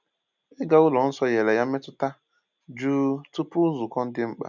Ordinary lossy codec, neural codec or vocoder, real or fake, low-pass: none; none; real; 7.2 kHz